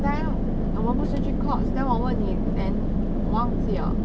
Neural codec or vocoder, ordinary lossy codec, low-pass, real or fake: none; none; none; real